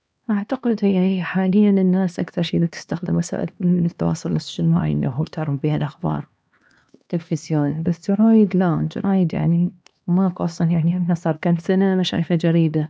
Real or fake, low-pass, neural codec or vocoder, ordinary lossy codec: fake; none; codec, 16 kHz, 2 kbps, X-Codec, HuBERT features, trained on LibriSpeech; none